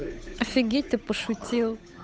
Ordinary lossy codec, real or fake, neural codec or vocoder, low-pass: none; fake; codec, 16 kHz, 8 kbps, FunCodec, trained on Chinese and English, 25 frames a second; none